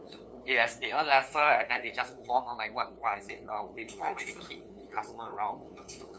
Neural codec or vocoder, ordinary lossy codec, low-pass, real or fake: codec, 16 kHz, 2 kbps, FunCodec, trained on LibriTTS, 25 frames a second; none; none; fake